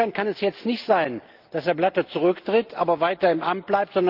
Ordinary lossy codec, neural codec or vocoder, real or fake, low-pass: Opus, 32 kbps; none; real; 5.4 kHz